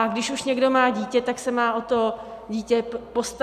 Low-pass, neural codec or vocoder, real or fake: 14.4 kHz; none; real